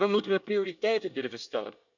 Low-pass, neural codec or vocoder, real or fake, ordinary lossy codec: 7.2 kHz; codec, 24 kHz, 1 kbps, SNAC; fake; none